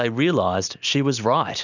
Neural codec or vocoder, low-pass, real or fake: none; 7.2 kHz; real